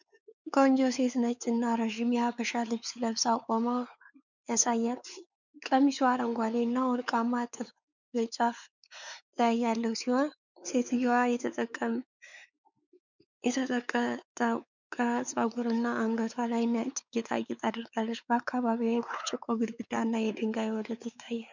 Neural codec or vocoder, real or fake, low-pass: codec, 16 kHz, 4 kbps, X-Codec, WavLM features, trained on Multilingual LibriSpeech; fake; 7.2 kHz